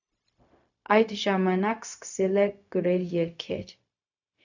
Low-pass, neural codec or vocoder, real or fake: 7.2 kHz; codec, 16 kHz, 0.4 kbps, LongCat-Audio-Codec; fake